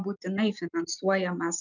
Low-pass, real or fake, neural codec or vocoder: 7.2 kHz; real; none